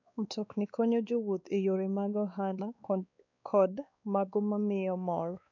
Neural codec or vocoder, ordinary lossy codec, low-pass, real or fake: codec, 16 kHz, 2 kbps, X-Codec, WavLM features, trained on Multilingual LibriSpeech; none; 7.2 kHz; fake